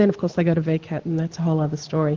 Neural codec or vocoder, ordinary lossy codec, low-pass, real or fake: none; Opus, 16 kbps; 7.2 kHz; real